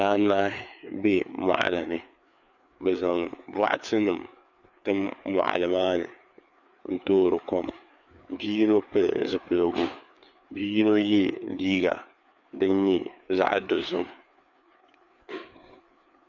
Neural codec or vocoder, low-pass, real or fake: codec, 16 kHz, 4 kbps, FreqCodec, larger model; 7.2 kHz; fake